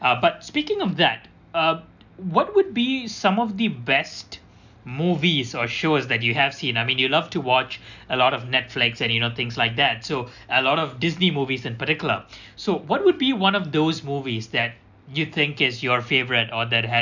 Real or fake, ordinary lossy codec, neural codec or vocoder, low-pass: real; none; none; 7.2 kHz